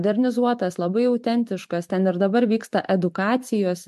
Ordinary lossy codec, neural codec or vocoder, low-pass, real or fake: MP3, 64 kbps; vocoder, 44.1 kHz, 128 mel bands every 512 samples, BigVGAN v2; 14.4 kHz; fake